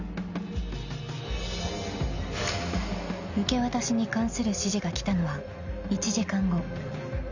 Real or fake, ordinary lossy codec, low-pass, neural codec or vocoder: real; none; 7.2 kHz; none